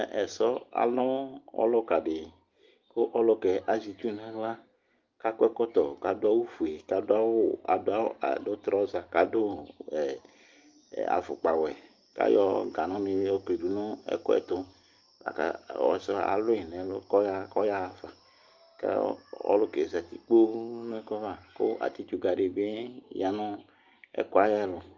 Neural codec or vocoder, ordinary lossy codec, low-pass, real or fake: none; Opus, 32 kbps; 7.2 kHz; real